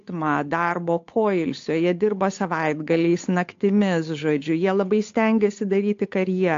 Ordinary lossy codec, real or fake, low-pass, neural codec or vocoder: AAC, 48 kbps; fake; 7.2 kHz; codec, 16 kHz, 8 kbps, FunCodec, trained on Chinese and English, 25 frames a second